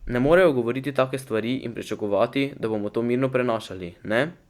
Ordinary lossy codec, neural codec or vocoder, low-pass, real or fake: Opus, 64 kbps; none; 19.8 kHz; real